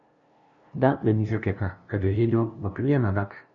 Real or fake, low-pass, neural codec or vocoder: fake; 7.2 kHz; codec, 16 kHz, 0.5 kbps, FunCodec, trained on LibriTTS, 25 frames a second